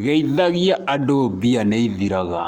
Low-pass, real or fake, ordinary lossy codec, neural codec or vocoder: 19.8 kHz; fake; Opus, 64 kbps; codec, 44.1 kHz, 7.8 kbps, Pupu-Codec